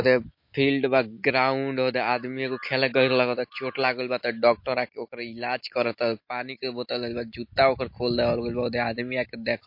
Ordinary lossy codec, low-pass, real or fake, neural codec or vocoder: MP3, 32 kbps; 5.4 kHz; real; none